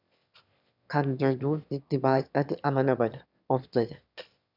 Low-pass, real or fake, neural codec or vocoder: 5.4 kHz; fake; autoencoder, 22.05 kHz, a latent of 192 numbers a frame, VITS, trained on one speaker